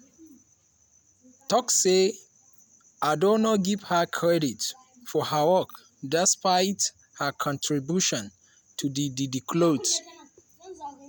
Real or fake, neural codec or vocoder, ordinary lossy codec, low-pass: real; none; none; none